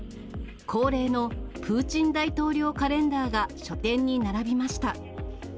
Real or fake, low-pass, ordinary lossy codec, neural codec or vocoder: real; none; none; none